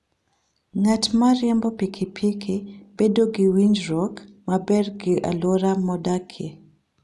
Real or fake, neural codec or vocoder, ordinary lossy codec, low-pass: real; none; none; none